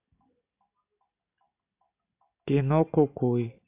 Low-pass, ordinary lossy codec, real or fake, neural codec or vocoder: 3.6 kHz; AAC, 32 kbps; real; none